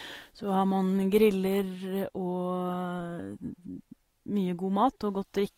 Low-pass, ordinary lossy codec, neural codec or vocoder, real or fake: 19.8 kHz; AAC, 48 kbps; none; real